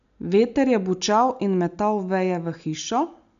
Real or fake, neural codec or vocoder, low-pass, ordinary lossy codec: real; none; 7.2 kHz; none